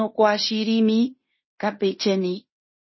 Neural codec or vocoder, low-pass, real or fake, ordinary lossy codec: codec, 16 kHz in and 24 kHz out, 0.9 kbps, LongCat-Audio-Codec, fine tuned four codebook decoder; 7.2 kHz; fake; MP3, 24 kbps